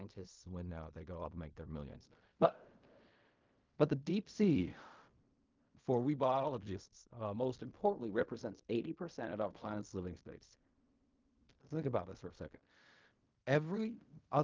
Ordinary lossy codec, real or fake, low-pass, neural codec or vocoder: Opus, 24 kbps; fake; 7.2 kHz; codec, 16 kHz in and 24 kHz out, 0.4 kbps, LongCat-Audio-Codec, fine tuned four codebook decoder